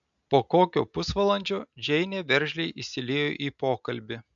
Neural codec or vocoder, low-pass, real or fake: none; 7.2 kHz; real